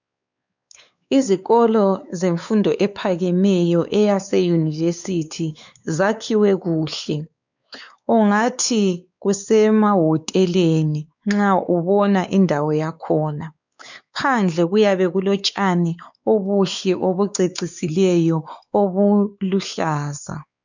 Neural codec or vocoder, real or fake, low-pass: codec, 16 kHz, 4 kbps, X-Codec, WavLM features, trained on Multilingual LibriSpeech; fake; 7.2 kHz